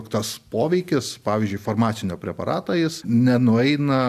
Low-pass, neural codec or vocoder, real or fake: 14.4 kHz; none; real